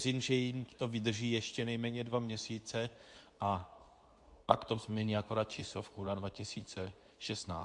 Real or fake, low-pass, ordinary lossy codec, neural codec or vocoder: fake; 10.8 kHz; AAC, 64 kbps; codec, 24 kHz, 0.9 kbps, WavTokenizer, medium speech release version 2